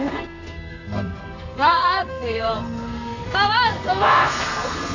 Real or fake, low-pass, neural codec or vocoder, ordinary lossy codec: fake; 7.2 kHz; codec, 32 kHz, 1.9 kbps, SNAC; AAC, 48 kbps